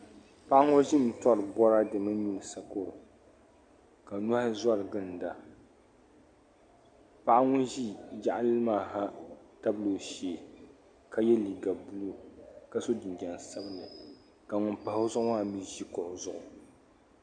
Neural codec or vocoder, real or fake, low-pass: none; real; 9.9 kHz